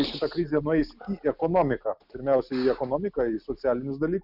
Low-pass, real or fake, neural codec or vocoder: 5.4 kHz; real; none